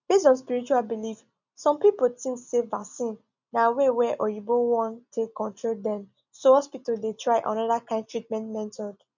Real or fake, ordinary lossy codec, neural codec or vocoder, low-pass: real; none; none; 7.2 kHz